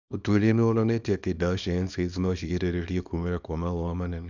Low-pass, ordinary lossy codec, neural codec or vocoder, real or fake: 7.2 kHz; none; codec, 24 kHz, 0.9 kbps, WavTokenizer, small release; fake